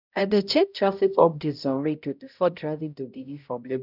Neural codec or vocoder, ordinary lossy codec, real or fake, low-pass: codec, 16 kHz, 0.5 kbps, X-Codec, HuBERT features, trained on balanced general audio; none; fake; 5.4 kHz